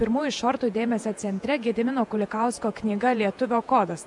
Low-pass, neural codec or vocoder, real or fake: 10.8 kHz; vocoder, 48 kHz, 128 mel bands, Vocos; fake